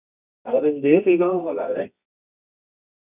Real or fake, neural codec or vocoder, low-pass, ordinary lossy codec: fake; codec, 24 kHz, 0.9 kbps, WavTokenizer, medium music audio release; 3.6 kHz; none